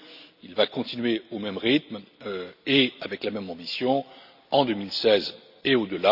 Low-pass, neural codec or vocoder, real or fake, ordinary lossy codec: 5.4 kHz; none; real; none